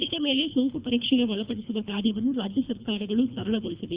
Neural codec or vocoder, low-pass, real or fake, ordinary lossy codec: codec, 24 kHz, 3 kbps, HILCodec; 3.6 kHz; fake; Opus, 64 kbps